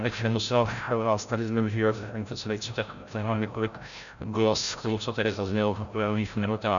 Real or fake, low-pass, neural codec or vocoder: fake; 7.2 kHz; codec, 16 kHz, 0.5 kbps, FreqCodec, larger model